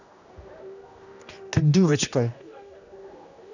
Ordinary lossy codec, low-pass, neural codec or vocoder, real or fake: none; 7.2 kHz; codec, 16 kHz, 1 kbps, X-Codec, HuBERT features, trained on general audio; fake